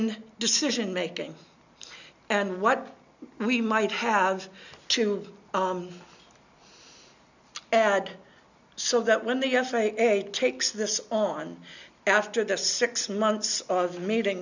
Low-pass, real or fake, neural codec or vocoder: 7.2 kHz; real; none